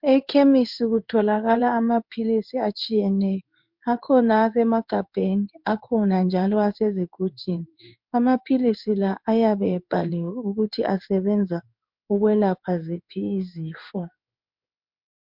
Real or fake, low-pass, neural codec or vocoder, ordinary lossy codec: fake; 5.4 kHz; codec, 16 kHz in and 24 kHz out, 1 kbps, XY-Tokenizer; MP3, 48 kbps